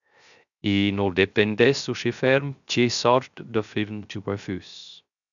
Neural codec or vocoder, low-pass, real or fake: codec, 16 kHz, 0.3 kbps, FocalCodec; 7.2 kHz; fake